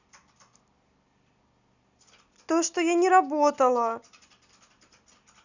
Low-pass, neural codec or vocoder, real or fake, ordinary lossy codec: 7.2 kHz; none; real; none